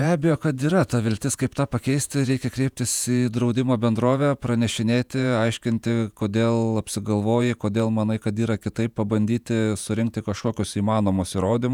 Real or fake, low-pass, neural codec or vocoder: fake; 19.8 kHz; vocoder, 48 kHz, 128 mel bands, Vocos